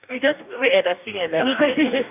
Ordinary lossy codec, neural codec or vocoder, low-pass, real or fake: none; codec, 44.1 kHz, 2.6 kbps, DAC; 3.6 kHz; fake